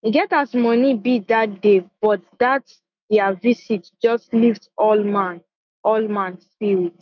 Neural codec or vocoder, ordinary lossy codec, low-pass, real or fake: autoencoder, 48 kHz, 128 numbers a frame, DAC-VAE, trained on Japanese speech; none; 7.2 kHz; fake